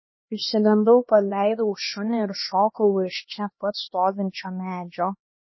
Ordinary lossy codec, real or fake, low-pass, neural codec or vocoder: MP3, 24 kbps; fake; 7.2 kHz; codec, 16 kHz, 2 kbps, X-Codec, HuBERT features, trained on LibriSpeech